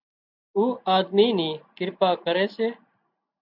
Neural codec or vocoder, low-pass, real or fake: none; 5.4 kHz; real